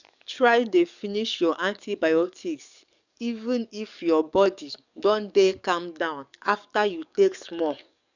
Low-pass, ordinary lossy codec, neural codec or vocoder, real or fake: 7.2 kHz; none; codec, 44.1 kHz, 7.8 kbps, DAC; fake